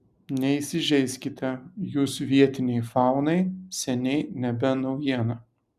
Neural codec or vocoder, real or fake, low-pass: none; real; 14.4 kHz